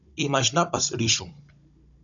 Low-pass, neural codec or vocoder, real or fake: 7.2 kHz; codec, 16 kHz, 16 kbps, FunCodec, trained on Chinese and English, 50 frames a second; fake